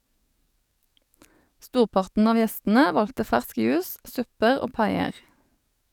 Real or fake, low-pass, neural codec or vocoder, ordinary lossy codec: fake; 19.8 kHz; codec, 44.1 kHz, 7.8 kbps, DAC; none